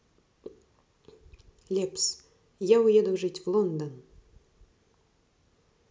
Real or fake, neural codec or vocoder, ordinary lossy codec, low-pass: real; none; none; none